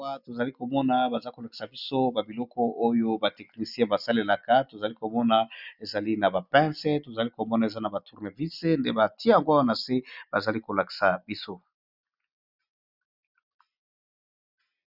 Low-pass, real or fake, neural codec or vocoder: 5.4 kHz; real; none